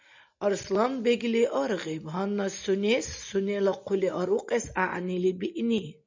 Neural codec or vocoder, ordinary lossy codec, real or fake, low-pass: none; MP3, 64 kbps; real; 7.2 kHz